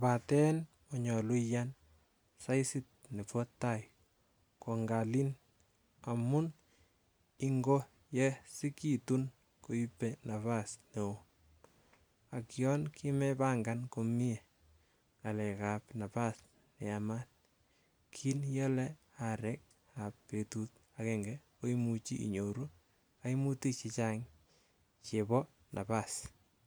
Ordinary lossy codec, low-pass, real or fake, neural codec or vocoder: none; none; real; none